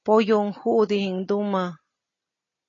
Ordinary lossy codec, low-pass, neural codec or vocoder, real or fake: AAC, 32 kbps; 7.2 kHz; none; real